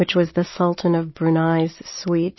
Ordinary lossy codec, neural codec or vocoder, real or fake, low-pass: MP3, 24 kbps; none; real; 7.2 kHz